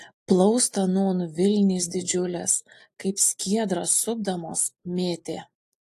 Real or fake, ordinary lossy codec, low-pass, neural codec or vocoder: real; AAC, 64 kbps; 14.4 kHz; none